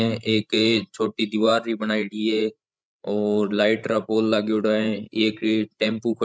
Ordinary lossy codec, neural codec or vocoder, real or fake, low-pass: none; codec, 16 kHz, 16 kbps, FreqCodec, larger model; fake; none